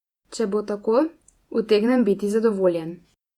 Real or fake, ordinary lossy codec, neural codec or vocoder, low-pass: fake; Opus, 64 kbps; vocoder, 48 kHz, 128 mel bands, Vocos; 19.8 kHz